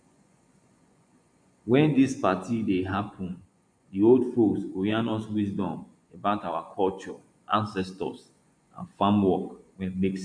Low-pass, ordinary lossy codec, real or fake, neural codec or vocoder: 9.9 kHz; MP3, 96 kbps; fake; vocoder, 24 kHz, 100 mel bands, Vocos